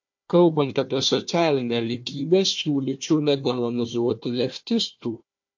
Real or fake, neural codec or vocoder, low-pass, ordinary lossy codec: fake; codec, 16 kHz, 1 kbps, FunCodec, trained on Chinese and English, 50 frames a second; 7.2 kHz; MP3, 48 kbps